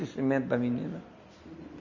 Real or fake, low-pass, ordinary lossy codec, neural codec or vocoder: real; 7.2 kHz; none; none